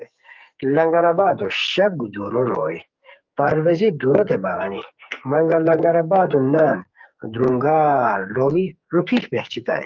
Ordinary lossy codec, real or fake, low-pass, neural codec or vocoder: Opus, 32 kbps; fake; 7.2 kHz; codec, 44.1 kHz, 2.6 kbps, SNAC